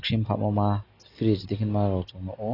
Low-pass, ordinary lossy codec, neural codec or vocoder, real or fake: 5.4 kHz; AAC, 24 kbps; none; real